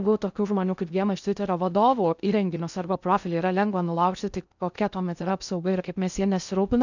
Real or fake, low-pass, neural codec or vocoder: fake; 7.2 kHz; codec, 16 kHz in and 24 kHz out, 0.6 kbps, FocalCodec, streaming, 2048 codes